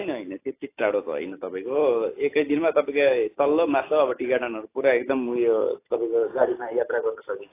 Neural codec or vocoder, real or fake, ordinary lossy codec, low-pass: none; real; AAC, 24 kbps; 3.6 kHz